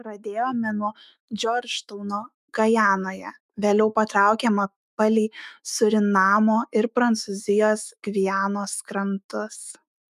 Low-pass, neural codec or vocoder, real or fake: 14.4 kHz; autoencoder, 48 kHz, 128 numbers a frame, DAC-VAE, trained on Japanese speech; fake